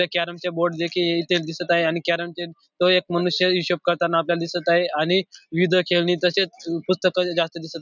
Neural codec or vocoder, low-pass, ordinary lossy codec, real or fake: none; 7.2 kHz; none; real